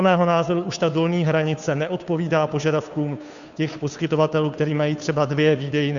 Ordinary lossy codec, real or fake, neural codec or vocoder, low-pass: MP3, 96 kbps; fake; codec, 16 kHz, 2 kbps, FunCodec, trained on Chinese and English, 25 frames a second; 7.2 kHz